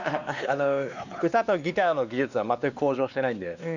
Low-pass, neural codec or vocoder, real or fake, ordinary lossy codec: 7.2 kHz; codec, 16 kHz, 2 kbps, X-Codec, WavLM features, trained on Multilingual LibriSpeech; fake; none